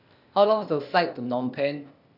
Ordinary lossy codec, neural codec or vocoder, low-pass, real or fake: none; codec, 16 kHz, 0.8 kbps, ZipCodec; 5.4 kHz; fake